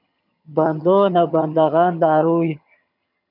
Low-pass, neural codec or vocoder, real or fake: 5.4 kHz; vocoder, 22.05 kHz, 80 mel bands, HiFi-GAN; fake